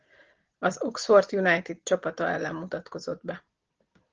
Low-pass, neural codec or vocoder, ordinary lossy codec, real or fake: 7.2 kHz; none; Opus, 16 kbps; real